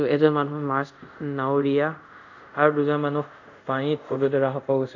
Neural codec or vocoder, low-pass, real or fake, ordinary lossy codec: codec, 24 kHz, 0.5 kbps, DualCodec; 7.2 kHz; fake; none